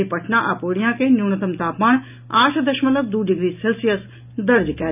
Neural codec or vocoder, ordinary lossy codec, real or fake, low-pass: none; none; real; 3.6 kHz